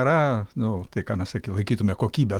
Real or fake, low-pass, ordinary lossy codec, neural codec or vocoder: fake; 19.8 kHz; Opus, 24 kbps; autoencoder, 48 kHz, 128 numbers a frame, DAC-VAE, trained on Japanese speech